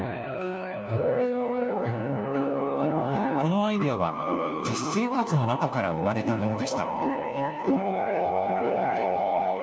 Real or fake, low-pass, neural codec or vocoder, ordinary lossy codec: fake; none; codec, 16 kHz, 1 kbps, FunCodec, trained on LibriTTS, 50 frames a second; none